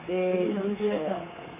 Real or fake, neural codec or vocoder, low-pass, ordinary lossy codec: fake; codec, 24 kHz, 3.1 kbps, DualCodec; 3.6 kHz; MP3, 24 kbps